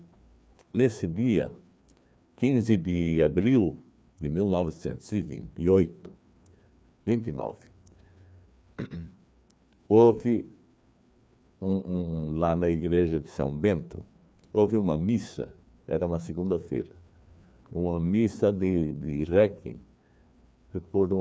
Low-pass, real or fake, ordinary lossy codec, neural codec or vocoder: none; fake; none; codec, 16 kHz, 2 kbps, FreqCodec, larger model